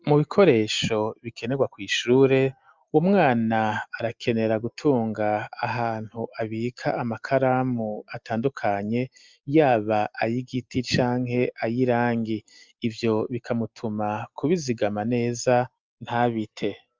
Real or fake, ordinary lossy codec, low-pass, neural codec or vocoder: real; Opus, 24 kbps; 7.2 kHz; none